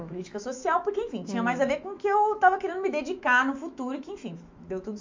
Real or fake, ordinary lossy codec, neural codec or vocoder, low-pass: fake; MP3, 48 kbps; autoencoder, 48 kHz, 128 numbers a frame, DAC-VAE, trained on Japanese speech; 7.2 kHz